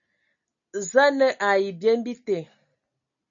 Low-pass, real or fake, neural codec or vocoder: 7.2 kHz; real; none